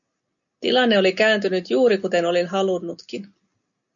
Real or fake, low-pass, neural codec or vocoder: real; 7.2 kHz; none